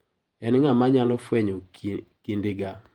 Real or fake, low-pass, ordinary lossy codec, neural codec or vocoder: fake; 19.8 kHz; Opus, 32 kbps; vocoder, 48 kHz, 128 mel bands, Vocos